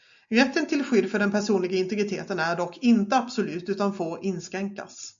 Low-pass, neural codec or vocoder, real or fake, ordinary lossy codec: 7.2 kHz; none; real; MP3, 64 kbps